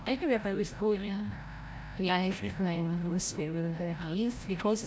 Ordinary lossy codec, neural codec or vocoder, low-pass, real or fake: none; codec, 16 kHz, 0.5 kbps, FreqCodec, larger model; none; fake